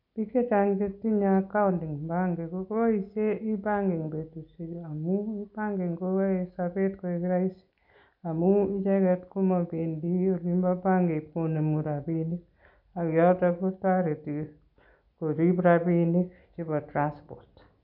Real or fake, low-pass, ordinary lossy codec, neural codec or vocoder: real; 5.4 kHz; none; none